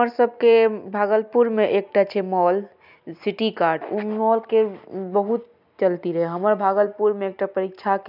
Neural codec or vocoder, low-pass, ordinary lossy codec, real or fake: none; 5.4 kHz; none; real